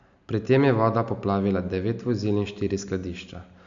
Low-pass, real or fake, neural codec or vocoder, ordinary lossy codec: 7.2 kHz; real; none; AAC, 64 kbps